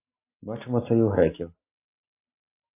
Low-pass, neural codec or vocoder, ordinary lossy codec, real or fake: 3.6 kHz; none; MP3, 24 kbps; real